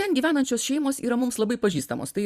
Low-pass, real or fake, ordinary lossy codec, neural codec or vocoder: 14.4 kHz; fake; Opus, 64 kbps; vocoder, 44.1 kHz, 128 mel bands every 256 samples, BigVGAN v2